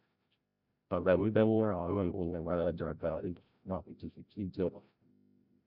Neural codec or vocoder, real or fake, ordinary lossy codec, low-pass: codec, 16 kHz, 0.5 kbps, FreqCodec, larger model; fake; none; 5.4 kHz